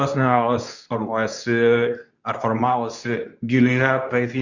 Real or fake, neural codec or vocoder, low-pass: fake; codec, 24 kHz, 0.9 kbps, WavTokenizer, medium speech release version 2; 7.2 kHz